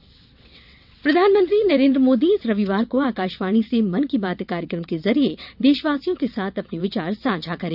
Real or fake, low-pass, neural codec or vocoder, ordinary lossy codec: fake; 5.4 kHz; vocoder, 22.05 kHz, 80 mel bands, WaveNeXt; none